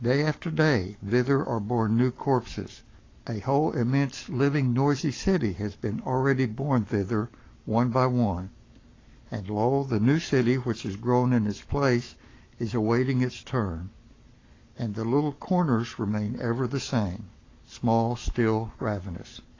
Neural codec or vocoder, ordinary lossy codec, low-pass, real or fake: codec, 44.1 kHz, 7.8 kbps, Pupu-Codec; AAC, 32 kbps; 7.2 kHz; fake